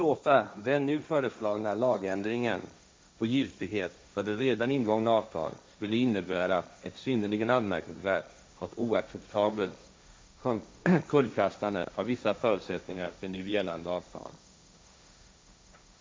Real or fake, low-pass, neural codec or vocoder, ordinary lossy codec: fake; none; codec, 16 kHz, 1.1 kbps, Voila-Tokenizer; none